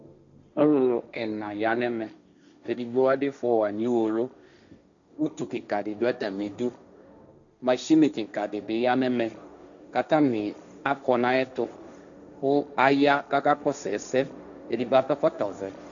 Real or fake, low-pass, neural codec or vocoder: fake; 7.2 kHz; codec, 16 kHz, 1.1 kbps, Voila-Tokenizer